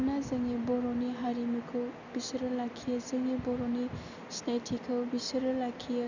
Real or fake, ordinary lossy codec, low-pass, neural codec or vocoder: real; none; 7.2 kHz; none